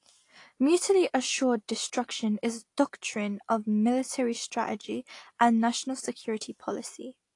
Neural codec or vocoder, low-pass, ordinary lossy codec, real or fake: none; 10.8 kHz; AAC, 48 kbps; real